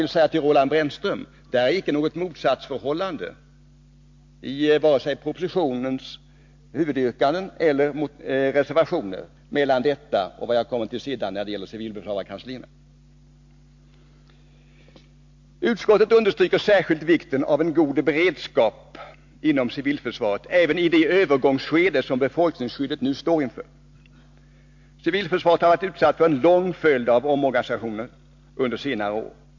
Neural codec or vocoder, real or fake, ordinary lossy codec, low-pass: none; real; MP3, 48 kbps; 7.2 kHz